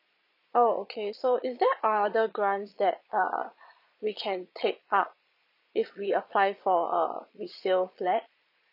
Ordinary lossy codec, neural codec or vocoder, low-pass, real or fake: none; vocoder, 22.05 kHz, 80 mel bands, Vocos; 5.4 kHz; fake